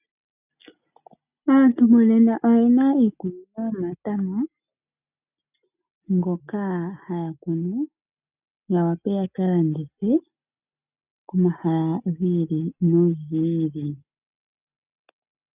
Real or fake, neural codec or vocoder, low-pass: real; none; 3.6 kHz